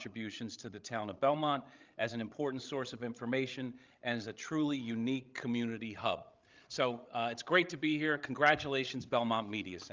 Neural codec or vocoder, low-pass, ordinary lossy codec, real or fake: codec, 16 kHz, 16 kbps, FreqCodec, larger model; 7.2 kHz; Opus, 32 kbps; fake